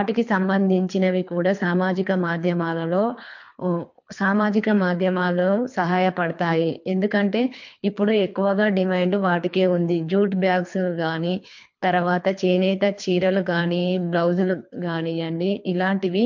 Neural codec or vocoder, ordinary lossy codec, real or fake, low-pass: codec, 24 kHz, 3 kbps, HILCodec; MP3, 48 kbps; fake; 7.2 kHz